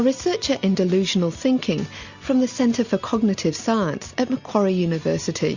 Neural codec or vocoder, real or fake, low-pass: none; real; 7.2 kHz